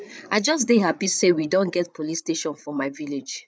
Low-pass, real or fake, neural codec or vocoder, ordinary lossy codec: none; fake; codec, 16 kHz, 8 kbps, FreqCodec, larger model; none